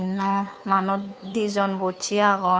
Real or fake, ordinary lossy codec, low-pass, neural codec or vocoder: fake; none; none; codec, 16 kHz, 2 kbps, FunCodec, trained on Chinese and English, 25 frames a second